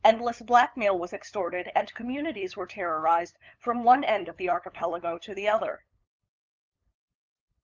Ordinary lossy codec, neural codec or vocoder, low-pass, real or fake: Opus, 24 kbps; codec, 16 kHz, 8 kbps, FunCodec, trained on LibriTTS, 25 frames a second; 7.2 kHz; fake